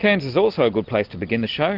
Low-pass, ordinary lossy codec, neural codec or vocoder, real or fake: 5.4 kHz; Opus, 32 kbps; none; real